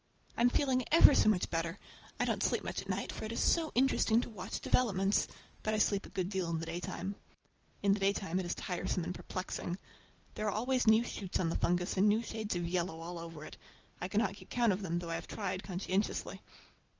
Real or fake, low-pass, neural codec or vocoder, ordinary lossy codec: real; 7.2 kHz; none; Opus, 24 kbps